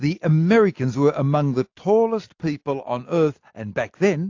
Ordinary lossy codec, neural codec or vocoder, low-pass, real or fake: AAC, 48 kbps; none; 7.2 kHz; real